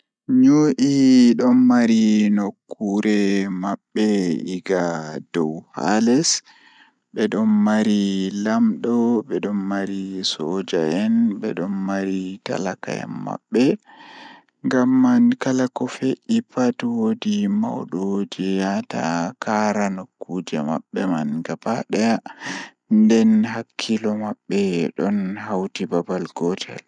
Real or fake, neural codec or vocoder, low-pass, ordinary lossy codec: real; none; 9.9 kHz; none